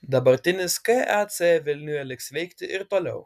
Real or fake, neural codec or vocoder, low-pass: real; none; 14.4 kHz